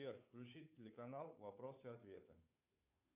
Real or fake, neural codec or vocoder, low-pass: fake; codec, 16 kHz, 2 kbps, FunCodec, trained on Chinese and English, 25 frames a second; 3.6 kHz